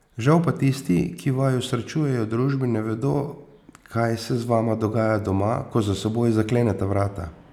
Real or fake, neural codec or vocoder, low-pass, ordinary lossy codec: real; none; 19.8 kHz; none